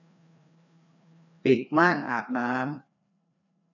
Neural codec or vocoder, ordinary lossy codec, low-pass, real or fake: codec, 16 kHz, 2 kbps, FreqCodec, larger model; none; 7.2 kHz; fake